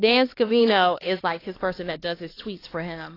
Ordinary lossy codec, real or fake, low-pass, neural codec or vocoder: AAC, 24 kbps; fake; 5.4 kHz; codec, 16 kHz, 2 kbps, X-Codec, HuBERT features, trained on LibriSpeech